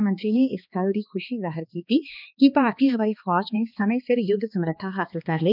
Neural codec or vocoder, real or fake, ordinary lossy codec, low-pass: codec, 16 kHz, 2 kbps, X-Codec, HuBERT features, trained on balanced general audio; fake; none; 5.4 kHz